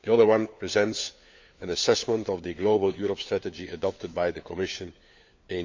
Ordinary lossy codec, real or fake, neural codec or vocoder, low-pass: MP3, 64 kbps; fake; codec, 16 kHz, 4 kbps, FunCodec, trained on LibriTTS, 50 frames a second; 7.2 kHz